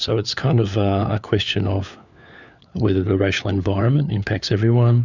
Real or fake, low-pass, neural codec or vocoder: fake; 7.2 kHz; codec, 16 kHz, 4 kbps, FunCodec, trained on LibriTTS, 50 frames a second